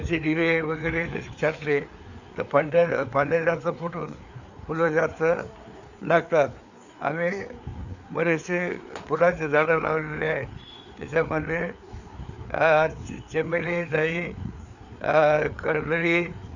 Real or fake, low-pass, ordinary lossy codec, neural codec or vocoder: fake; 7.2 kHz; none; codec, 16 kHz, 4 kbps, FreqCodec, larger model